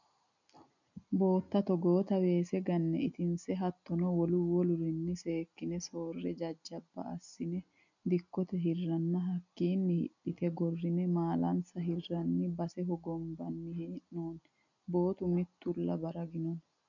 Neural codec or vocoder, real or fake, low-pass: none; real; 7.2 kHz